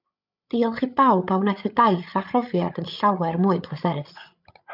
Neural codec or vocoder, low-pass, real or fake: codec, 16 kHz, 8 kbps, FreqCodec, larger model; 5.4 kHz; fake